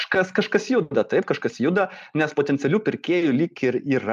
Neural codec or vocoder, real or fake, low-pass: none; real; 14.4 kHz